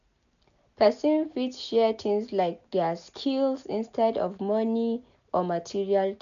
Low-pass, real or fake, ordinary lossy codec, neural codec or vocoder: 7.2 kHz; real; none; none